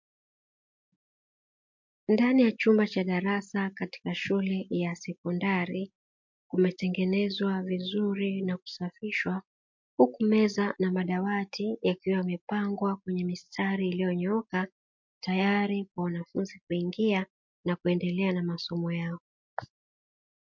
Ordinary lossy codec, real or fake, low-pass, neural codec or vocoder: MP3, 48 kbps; real; 7.2 kHz; none